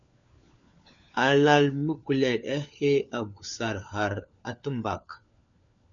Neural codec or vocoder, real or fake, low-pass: codec, 16 kHz, 4 kbps, FunCodec, trained on LibriTTS, 50 frames a second; fake; 7.2 kHz